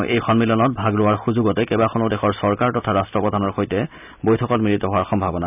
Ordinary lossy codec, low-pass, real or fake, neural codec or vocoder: none; 3.6 kHz; real; none